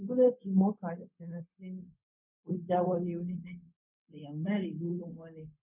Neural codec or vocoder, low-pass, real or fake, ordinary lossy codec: codec, 16 kHz, 0.4 kbps, LongCat-Audio-Codec; 3.6 kHz; fake; none